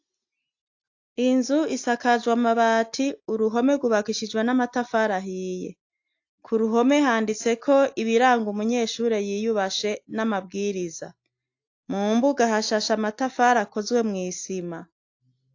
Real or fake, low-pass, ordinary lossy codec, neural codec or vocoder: real; 7.2 kHz; AAC, 48 kbps; none